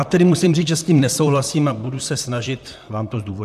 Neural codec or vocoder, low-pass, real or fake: vocoder, 44.1 kHz, 128 mel bands, Pupu-Vocoder; 14.4 kHz; fake